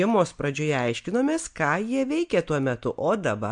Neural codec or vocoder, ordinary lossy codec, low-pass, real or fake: none; MP3, 64 kbps; 9.9 kHz; real